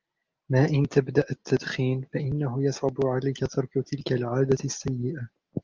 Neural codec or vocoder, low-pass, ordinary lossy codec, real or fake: none; 7.2 kHz; Opus, 32 kbps; real